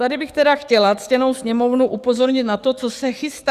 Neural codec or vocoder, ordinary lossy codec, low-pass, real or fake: codec, 44.1 kHz, 7.8 kbps, DAC; Opus, 64 kbps; 14.4 kHz; fake